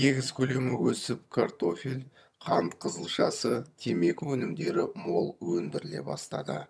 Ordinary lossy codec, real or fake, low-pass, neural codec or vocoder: none; fake; none; vocoder, 22.05 kHz, 80 mel bands, HiFi-GAN